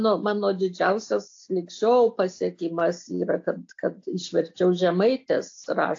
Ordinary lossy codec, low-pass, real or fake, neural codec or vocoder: AAC, 48 kbps; 7.2 kHz; real; none